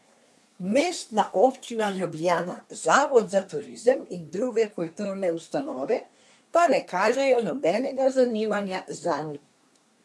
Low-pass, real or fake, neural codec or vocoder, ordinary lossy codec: none; fake; codec, 24 kHz, 1 kbps, SNAC; none